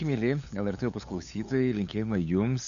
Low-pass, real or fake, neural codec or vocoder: 7.2 kHz; fake; codec, 16 kHz, 8 kbps, FunCodec, trained on LibriTTS, 25 frames a second